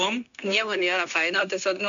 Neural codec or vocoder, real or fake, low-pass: codec, 16 kHz, 0.9 kbps, LongCat-Audio-Codec; fake; 7.2 kHz